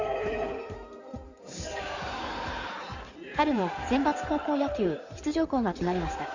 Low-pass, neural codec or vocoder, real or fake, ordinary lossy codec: 7.2 kHz; codec, 16 kHz in and 24 kHz out, 2.2 kbps, FireRedTTS-2 codec; fake; Opus, 64 kbps